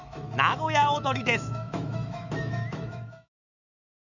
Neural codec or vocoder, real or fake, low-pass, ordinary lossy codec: autoencoder, 48 kHz, 128 numbers a frame, DAC-VAE, trained on Japanese speech; fake; 7.2 kHz; none